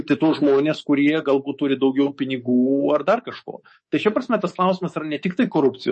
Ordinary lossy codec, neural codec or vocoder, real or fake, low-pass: MP3, 32 kbps; codec, 24 kHz, 3.1 kbps, DualCodec; fake; 10.8 kHz